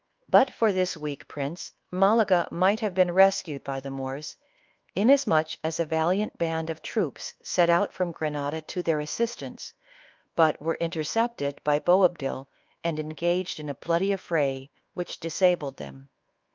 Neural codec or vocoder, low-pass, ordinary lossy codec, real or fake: codec, 24 kHz, 1.2 kbps, DualCodec; 7.2 kHz; Opus, 24 kbps; fake